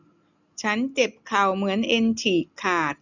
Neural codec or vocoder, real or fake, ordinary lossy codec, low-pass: none; real; none; 7.2 kHz